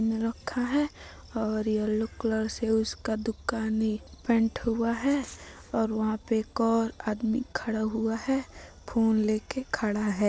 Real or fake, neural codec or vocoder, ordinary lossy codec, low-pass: real; none; none; none